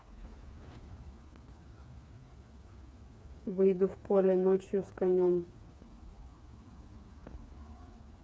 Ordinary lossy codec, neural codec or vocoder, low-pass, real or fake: none; codec, 16 kHz, 4 kbps, FreqCodec, smaller model; none; fake